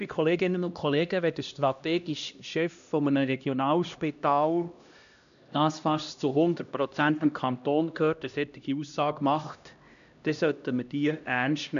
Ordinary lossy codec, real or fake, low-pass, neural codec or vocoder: none; fake; 7.2 kHz; codec, 16 kHz, 1 kbps, X-Codec, HuBERT features, trained on LibriSpeech